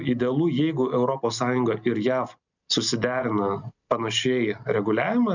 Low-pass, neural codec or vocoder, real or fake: 7.2 kHz; none; real